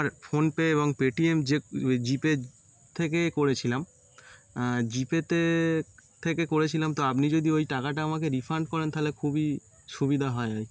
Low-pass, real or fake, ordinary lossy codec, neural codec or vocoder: none; real; none; none